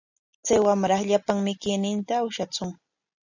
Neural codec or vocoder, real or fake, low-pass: none; real; 7.2 kHz